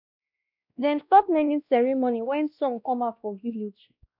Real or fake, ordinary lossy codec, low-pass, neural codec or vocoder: fake; none; 5.4 kHz; codec, 16 kHz, 1 kbps, X-Codec, WavLM features, trained on Multilingual LibriSpeech